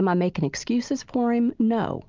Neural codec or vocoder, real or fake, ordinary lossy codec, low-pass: none; real; Opus, 24 kbps; 7.2 kHz